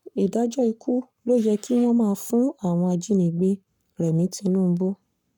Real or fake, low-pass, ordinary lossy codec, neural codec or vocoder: fake; 19.8 kHz; none; codec, 44.1 kHz, 7.8 kbps, Pupu-Codec